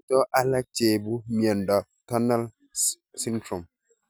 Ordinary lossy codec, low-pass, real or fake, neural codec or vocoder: none; none; real; none